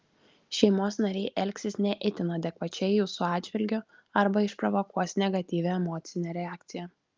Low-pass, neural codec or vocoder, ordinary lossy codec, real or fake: 7.2 kHz; none; Opus, 32 kbps; real